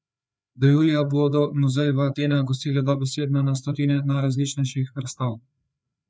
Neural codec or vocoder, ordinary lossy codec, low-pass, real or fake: codec, 16 kHz, 4 kbps, FreqCodec, larger model; none; none; fake